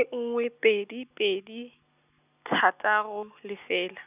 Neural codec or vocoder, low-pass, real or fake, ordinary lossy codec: none; 3.6 kHz; real; none